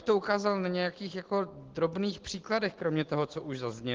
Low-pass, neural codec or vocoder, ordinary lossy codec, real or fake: 7.2 kHz; none; Opus, 16 kbps; real